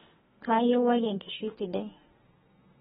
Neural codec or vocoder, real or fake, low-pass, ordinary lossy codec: codec, 32 kHz, 1.9 kbps, SNAC; fake; 14.4 kHz; AAC, 16 kbps